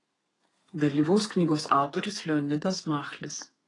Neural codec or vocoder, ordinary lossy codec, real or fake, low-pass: codec, 32 kHz, 1.9 kbps, SNAC; AAC, 32 kbps; fake; 10.8 kHz